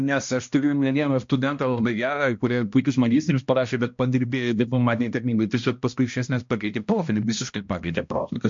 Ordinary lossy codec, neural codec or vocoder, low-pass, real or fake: MP3, 48 kbps; codec, 16 kHz, 1 kbps, X-Codec, HuBERT features, trained on balanced general audio; 7.2 kHz; fake